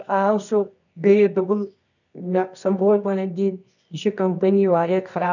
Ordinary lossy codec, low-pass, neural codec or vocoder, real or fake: none; 7.2 kHz; codec, 24 kHz, 0.9 kbps, WavTokenizer, medium music audio release; fake